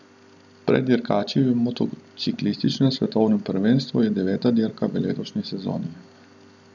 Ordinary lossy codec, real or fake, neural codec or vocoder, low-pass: none; real; none; 7.2 kHz